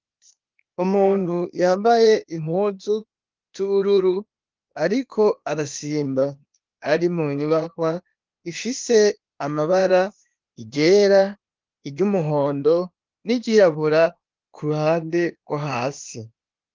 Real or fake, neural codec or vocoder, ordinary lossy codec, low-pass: fake; codec, 16 kHz, 0.8 kbps, ZipCodec; Opus, 24 kbps; 7.2 kHz